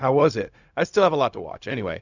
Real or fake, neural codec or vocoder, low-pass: fake; codec, 16 kHz, 0.4 kbps, LongCat-Audio-Codec; 7.2 kHz